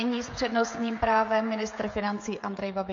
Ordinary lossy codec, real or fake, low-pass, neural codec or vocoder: MP3, 48 kbps; fake; 7.2 kHz; codec, 16 kHz, 16 kbps, FreqCodec, smaller model